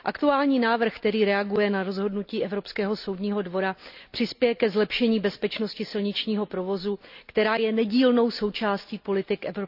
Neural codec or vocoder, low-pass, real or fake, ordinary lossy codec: none; 5.4 kHz; real; none